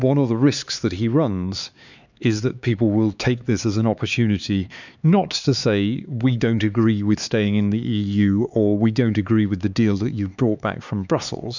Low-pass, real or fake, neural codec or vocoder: 7.2 kHz; fake; codec, 16 kHz, 4 kbps, X-Codec, HuBERT features, trained on LibriSpeech